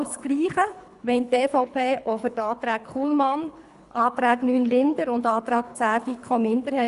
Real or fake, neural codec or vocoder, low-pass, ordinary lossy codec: fake; codec, 24 kHz, 3 kbps, HILCodec; 10.8 kHz; none